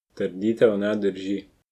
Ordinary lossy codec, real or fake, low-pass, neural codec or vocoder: none; real; 14.4 kHz; none